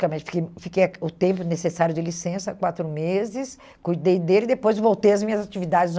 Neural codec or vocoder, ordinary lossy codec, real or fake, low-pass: none; none; real; none